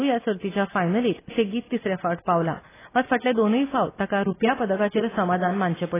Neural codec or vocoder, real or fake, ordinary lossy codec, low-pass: none; real; AAC, 16 kbps; 3.6 kHz